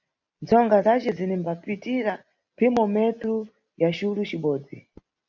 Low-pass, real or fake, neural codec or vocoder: 7.2 kHz; real; none